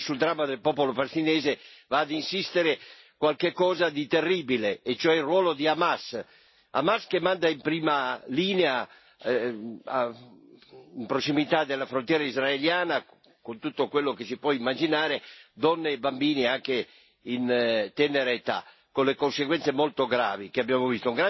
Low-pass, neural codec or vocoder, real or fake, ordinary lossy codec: 7.2 kHz; none; real; MP3, 24 kbps